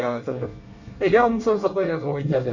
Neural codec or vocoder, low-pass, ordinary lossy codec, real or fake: codec, 24 kHz, 1 kbps, SNAC; 7.2 kHz; none; fake